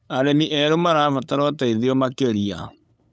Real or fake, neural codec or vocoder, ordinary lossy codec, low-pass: fake; codec, 16 kHz, 8 kbps, FunCodec, trained on LibriTTS, 25 frames a second; none; none